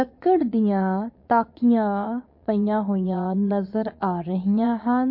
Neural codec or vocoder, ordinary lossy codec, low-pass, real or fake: vocoder, 22.05 kHz, 80 mel bands, Vocos; MP3, 32 kbps; 5.4 kHz; fake